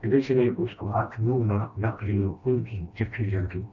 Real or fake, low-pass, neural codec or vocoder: fake; 7.2 kHz; codec, 16 kHz, 1 kbps, FreqCodec, smaller model